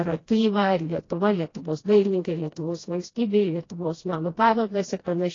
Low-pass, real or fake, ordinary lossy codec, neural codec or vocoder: 7.2 kHz; fake; AAC, 32 kbps; codec, 16 kHz, 1 kbps, FreqCodec, smaller model